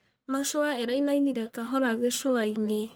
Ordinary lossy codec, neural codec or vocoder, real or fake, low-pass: none; codec, 44.1 kHz, 1.7 kbps, Pupu-Codec; fake; none